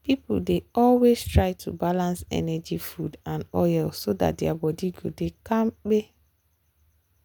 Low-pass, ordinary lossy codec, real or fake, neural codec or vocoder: none; none; real; none